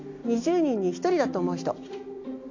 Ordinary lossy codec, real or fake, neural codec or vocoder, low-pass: AAC, 48 kbps; real; none; 7.2 kHz